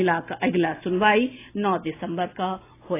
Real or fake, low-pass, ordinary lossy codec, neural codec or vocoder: real; 3.6 kHz; AAC, 24 kbps; none